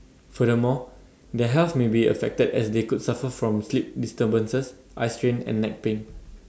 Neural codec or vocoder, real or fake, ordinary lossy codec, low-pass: none; real; none; none